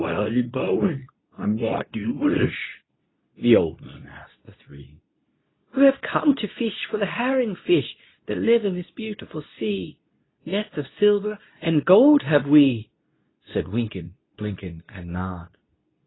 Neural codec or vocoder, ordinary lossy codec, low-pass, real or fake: codec, 24 kHz, 0.9 kbps, WavTokenizer, medium speech release version 2; AAC, 16 kbps; 7.2 kHz; fake